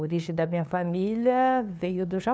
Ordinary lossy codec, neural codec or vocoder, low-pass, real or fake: none; codec, 16 kHz, 2 kbps, FunCodec, trained on LibriTTS, 25 frames a second; none; fake